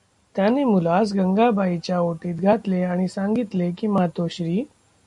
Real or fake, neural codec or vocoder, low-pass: real; none; 10.8 kHz